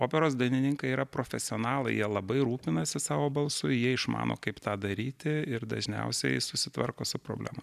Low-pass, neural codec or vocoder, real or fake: 14.4 kHz; none; real